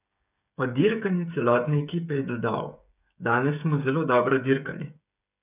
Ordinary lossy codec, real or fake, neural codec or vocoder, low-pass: none; fake; codec, 16 kHz, 8 kbps, FreqCodec, smaller model; 3.6 kHz